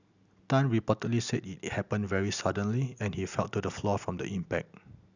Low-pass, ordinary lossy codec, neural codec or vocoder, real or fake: 7.2 kHz; none; none; real